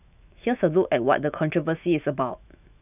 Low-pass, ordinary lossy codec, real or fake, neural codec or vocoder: 3.6 kHz; none; fake; autoencoder, 48 kHz, 128 numbers a frame, DAC-VAE, trained on Japanese speech